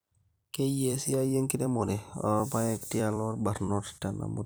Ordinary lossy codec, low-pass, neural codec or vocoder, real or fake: none; none; vocoder, 44.1 kHz, 128 mel bands every 256 samples, BigVGAN v2; fake